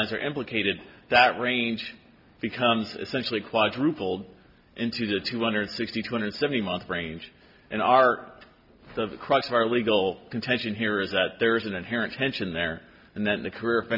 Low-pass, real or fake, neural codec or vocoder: 5.4 kHz; real; none